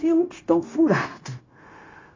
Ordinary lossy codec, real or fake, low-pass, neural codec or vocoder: AAC, 32 kbps; fake; 7.2 kHz; codec, 16 kHz, 0.9 kbps, LongCat-Audio-Codec